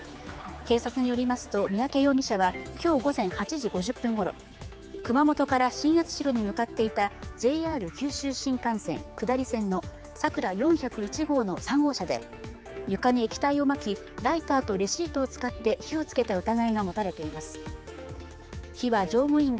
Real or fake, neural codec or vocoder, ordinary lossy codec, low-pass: fake; codec, 16 kHz, 4 kbps, X-Codec, HuBERT features, trained on general audio; none; none